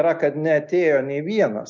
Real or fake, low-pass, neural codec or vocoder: real; 7.2 kHz; none